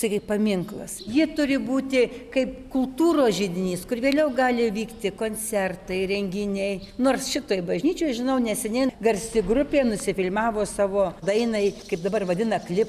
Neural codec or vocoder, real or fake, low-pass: none; real; 14.4 kHz